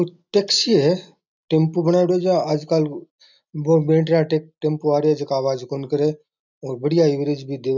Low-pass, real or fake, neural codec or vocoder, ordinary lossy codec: 7.2 kHz; real; none; none